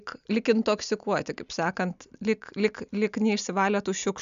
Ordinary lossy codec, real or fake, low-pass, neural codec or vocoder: MP3, 96 kbps; real; 7.2 kHz; none